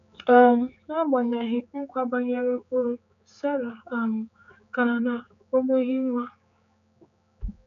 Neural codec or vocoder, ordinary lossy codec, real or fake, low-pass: codec, 16 kHz, 4 kbps, X-Codec, HuBERT features, trained on general audio; MP3, 96 kbps; fake; 7.2 kHz